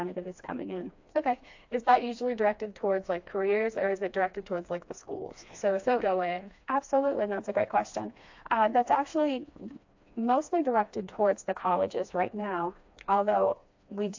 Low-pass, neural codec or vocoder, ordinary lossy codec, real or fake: 7.2 kHz; codec, 16 kHz, 2 kbps, FreqCodec, smaller model; AAC, 48 kbps; fake